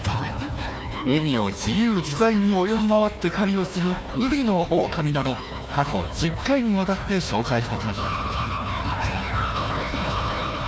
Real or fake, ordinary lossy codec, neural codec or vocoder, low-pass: fake; none; codec, 16 kHz, 1 kbps, FunCodec, trained on Chinese and English, 50 frames a second; none